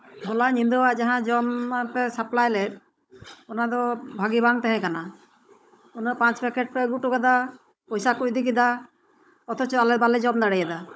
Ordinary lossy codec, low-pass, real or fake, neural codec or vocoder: none; none; fake; codec, 16 kHz, 16 kbps, FunCodec, trained on Chinese and English, 50 frames a second